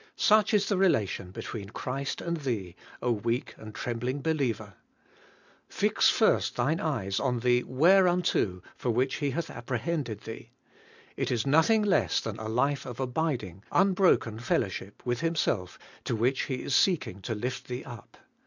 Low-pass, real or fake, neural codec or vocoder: 7.2 kHz; real; none